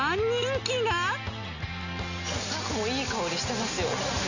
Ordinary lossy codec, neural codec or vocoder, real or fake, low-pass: none; none; real; 7.2 kHz